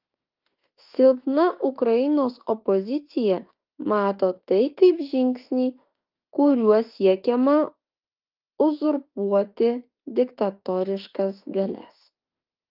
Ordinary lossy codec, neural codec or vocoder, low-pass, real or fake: Opus, 24 kbps; autoencoder, 48 kHz, 32 numbers a frame, DAC-VAE, trained on Japanese speech; 5.4 kHz; fake